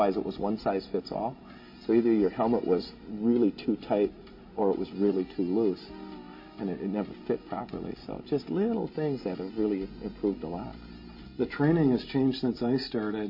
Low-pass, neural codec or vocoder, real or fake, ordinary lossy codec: 5.4 kHz; none; real; MP3, 24 kbps